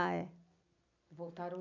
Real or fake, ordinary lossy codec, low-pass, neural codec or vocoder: real; none; 7.2 kHz; none